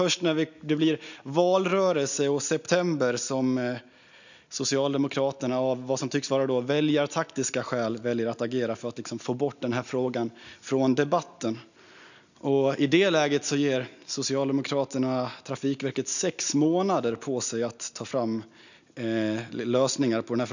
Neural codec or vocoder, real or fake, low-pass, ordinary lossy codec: none; real; 7.2 kHz; none